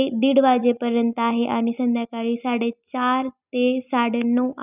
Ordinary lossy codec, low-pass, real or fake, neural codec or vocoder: none; 3.6 kHz; real; none